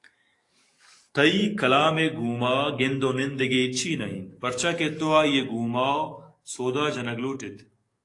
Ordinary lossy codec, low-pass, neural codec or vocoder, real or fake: AAC, 48 kbps; 10.8 kHz; codec, 44.1 kHz, 7.8 kbps, DAC; fake